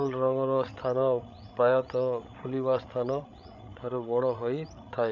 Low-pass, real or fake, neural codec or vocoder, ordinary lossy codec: 7.2 kHz; fake; codec, 16 kHz, 8 kbps, FreqCodec, larger model; none